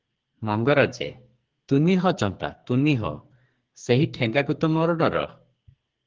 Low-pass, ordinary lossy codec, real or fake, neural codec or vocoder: 7.2 kHz; Opus, 16 kbps; fake; codec, 44.1 kHz, 2.6 kbps, SNAC